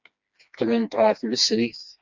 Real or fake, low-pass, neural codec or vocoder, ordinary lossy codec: fake; 7.2 kHz; codec, 16 kHz, 2 kbps, FreqCodec, smaller model; MP3, 64 kbps